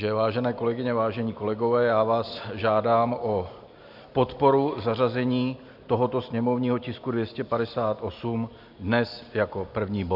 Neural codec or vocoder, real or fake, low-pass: none; real; 5.4 kHz